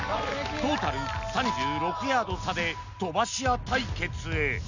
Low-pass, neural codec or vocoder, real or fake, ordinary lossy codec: 7.2 kHz; none; real; none